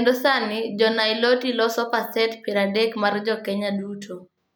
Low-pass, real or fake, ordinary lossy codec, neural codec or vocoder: none; real; none; none